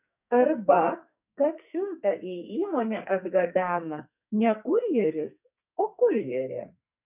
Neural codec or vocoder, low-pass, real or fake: codec, 44.1 kHz, 2.6 kbps, SNAC; 3.6 kHz; fake